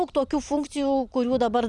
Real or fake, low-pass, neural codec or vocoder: fake; 10.8 kHz; vocoder, 44.1 kHz, 128 mel bands every 256 samples, BigVGAN v2